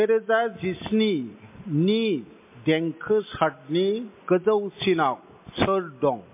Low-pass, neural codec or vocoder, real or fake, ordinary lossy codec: 3.6 kHz; none; real; MP3, 24 kbps